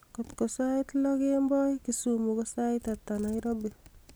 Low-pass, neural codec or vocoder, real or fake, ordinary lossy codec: none; none; real; none